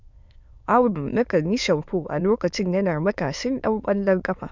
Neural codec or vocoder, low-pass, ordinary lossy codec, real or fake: autoencoder, 22.05 kHz, a latent of 192 numbers a frame, VITS, trained on many speakers; 7.2 kHz; Opus, 64 kbps; fake